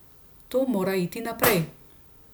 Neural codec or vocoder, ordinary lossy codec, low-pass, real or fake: none; none; none; real